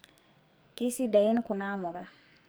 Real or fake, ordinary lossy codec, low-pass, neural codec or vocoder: fake; none; none; codec, 44.1 kHz, 2.6 kbps, SNAC